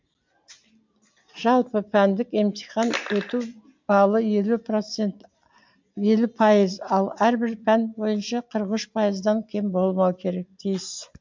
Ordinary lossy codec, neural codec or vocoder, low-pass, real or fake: MP3, 64 kbps; none; 7.2 kHz; real